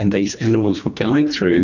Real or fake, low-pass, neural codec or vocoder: fake; 7.2 kHz; codec, 24 kHz, 1.5 kbps, HILCodec